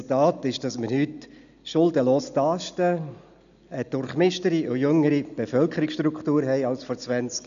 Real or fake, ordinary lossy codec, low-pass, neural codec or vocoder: real; none; 7.2 kHz; none